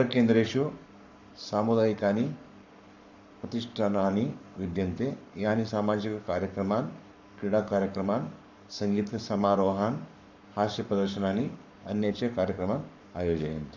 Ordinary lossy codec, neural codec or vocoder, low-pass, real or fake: none; codec, 44.1 kHz, 7.8 kbps, Pupu-Codec; 7.2 kHz; fake